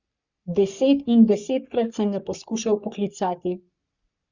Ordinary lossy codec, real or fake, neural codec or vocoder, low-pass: Opus, 64 kbps; fake; codec, 44.1 kHz, 3.4 kbps, Pupu-Codec; 7.2 kHz